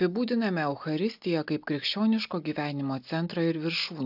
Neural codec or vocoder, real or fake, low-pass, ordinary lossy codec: none; real; 5.4 kHz; AAC, 48 kbps